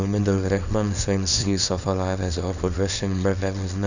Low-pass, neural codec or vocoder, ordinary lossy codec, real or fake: 7.2 kHz; codec, 24 kHz, 0.9 kbps, WavTokenizer, small release; none; fake